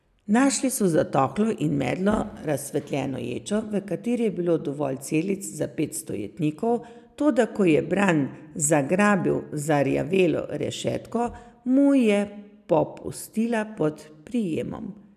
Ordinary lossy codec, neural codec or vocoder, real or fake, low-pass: none; none; real; 14.4 kHz